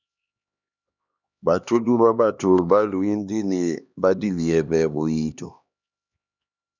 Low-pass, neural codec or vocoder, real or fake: 7.2 kHz; codec, 16 kHz, 2 kbps, X-Codec, HuBERT features, trained on LibriSpeech; fake